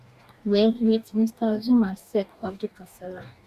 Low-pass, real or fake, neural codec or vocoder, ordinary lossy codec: 14.4 kHz; fake; codec, 44.1 kHz, 2.6 kbps, DAC; Opus, 64 kbps